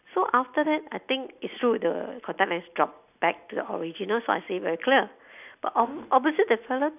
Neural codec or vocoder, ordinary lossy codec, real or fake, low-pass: none; none; real; 3.6 kHz